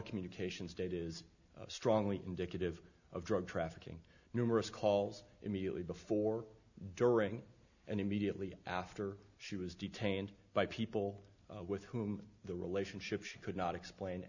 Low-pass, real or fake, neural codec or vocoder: 7.2 kHz; real; none